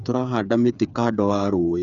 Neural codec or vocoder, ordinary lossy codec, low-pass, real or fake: codec, 16 kHz, 8 kbps, FreqCodec, smaller model; none; 7.2 kHz; fake